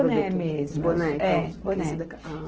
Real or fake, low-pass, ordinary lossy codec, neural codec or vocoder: real; 7.2 kHz; Opus, 16 kbps; none